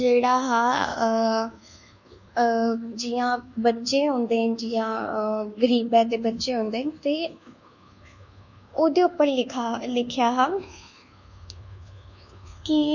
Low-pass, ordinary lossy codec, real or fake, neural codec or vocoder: 7.2 kHz; none; fake; codec, 24 kHz, 1.2 kbps, DualCodec